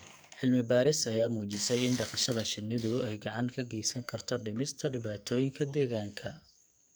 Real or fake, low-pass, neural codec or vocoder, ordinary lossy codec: fake; none; codec, 44.1 kHz, 2.6 kbps, SNAC; none